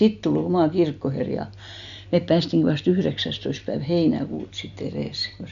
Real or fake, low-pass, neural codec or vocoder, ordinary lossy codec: real; 7.2 kHz; none; none